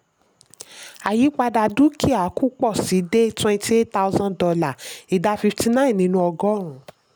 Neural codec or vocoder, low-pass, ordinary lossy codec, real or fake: none; none; none; real